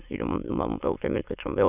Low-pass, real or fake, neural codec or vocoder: 3.6 kHz; fake; autoencoder, 22.05 kHz, a latent of 192 numbers a frame, VITS, trained on many speakers